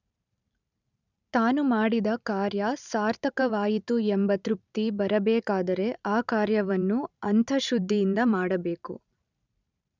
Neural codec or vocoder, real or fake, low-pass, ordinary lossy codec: vocoder, 44.1 kHz, 128 mel bands every 512 samples, BigVGAN v2; fake; 7.2 kHz; none